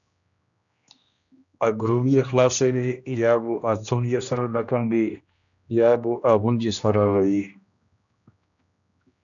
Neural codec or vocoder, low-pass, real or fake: codec, 16 kHz, 1 kbps, X-Codec, HuBERT features, trained on general audio; 7.2 kHz; fake